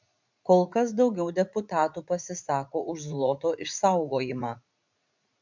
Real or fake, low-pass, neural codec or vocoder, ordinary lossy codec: fake; 7.2 kHz; vocoder, 44.1 kHz, 80 mel bands, Vocos; MP3, 64 kbps